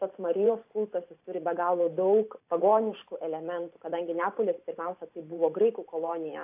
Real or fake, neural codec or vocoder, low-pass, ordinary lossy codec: fake; vocoder, 44.1 kHz, 128 mel bands every 512 samples, BigVGAN v2; 3.6 kHz; AAC, 32 kbps